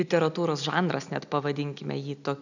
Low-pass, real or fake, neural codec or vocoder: 7.2 kHz; real; none